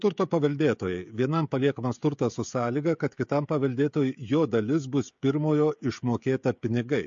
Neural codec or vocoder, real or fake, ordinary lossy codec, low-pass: codec, 16 kHz, 16 kbps, FreqCodec, smaller model; fake; MP3, 48 kbps; 7.2 kHz